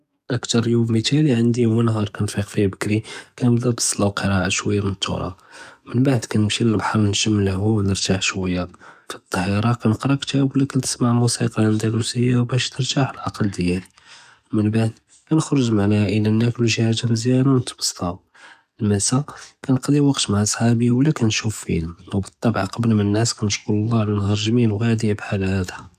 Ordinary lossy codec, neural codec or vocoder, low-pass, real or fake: none; codec, 44.1 kHz, 7.8 kbps, DAC; 14.4 kHz; fake